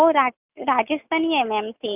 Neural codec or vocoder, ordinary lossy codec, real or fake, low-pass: none; none; real; 3.6 kHz